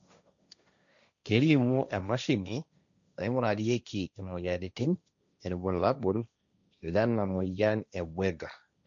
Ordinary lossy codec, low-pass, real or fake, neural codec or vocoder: none; 7.2 kHz; fake; codec, 16 kHz, 1.1 kbps, Voila-Tokenizer